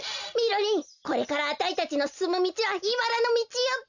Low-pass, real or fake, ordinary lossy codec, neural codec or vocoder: 7.2 kHz; real; none; none